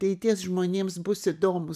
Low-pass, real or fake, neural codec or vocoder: 14.4 kHz; real; none